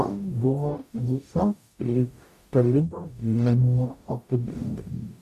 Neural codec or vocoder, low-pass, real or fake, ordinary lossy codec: codec, 44.1 kHz, 0.9 kbps, DAC; 14.4 kHz; fake; AAC, 96 kbps